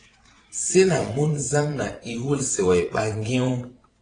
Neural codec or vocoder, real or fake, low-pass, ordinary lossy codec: vocoder, 22.05 kHz, 80 mel bands, WaveNeXt; fake; 9.9 kHz; AAC, 32 kbps